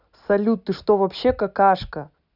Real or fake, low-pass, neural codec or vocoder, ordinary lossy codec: real; 5.4 kHz; none; none